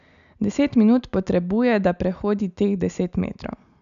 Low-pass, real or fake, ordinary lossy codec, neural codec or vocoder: 7.2 kHz; real; none; none